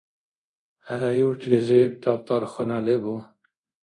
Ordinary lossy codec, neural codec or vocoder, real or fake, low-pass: MP3, 96 kbps; codec, 24 kHz, 0.5 kbps, DualCodec; fake; 10.8 kHz